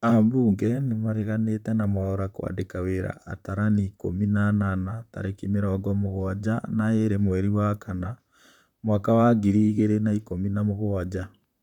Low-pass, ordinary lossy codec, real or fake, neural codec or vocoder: 19.8 kHz; none; fake; vocoder, 44.1 kHz, 128 mel bands, Pupu-Vocoder